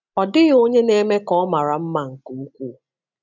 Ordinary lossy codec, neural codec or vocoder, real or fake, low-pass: none; none; real; 7.2 kHz